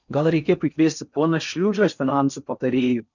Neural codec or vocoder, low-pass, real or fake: codec, 16 kHz in and 24 kHz out, 0.6 kbps, FocalCodec, streaming, 4096 codes; 7.2 kHz; fake